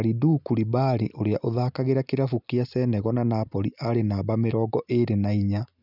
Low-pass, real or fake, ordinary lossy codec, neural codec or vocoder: 5.4 kHz; real; none; none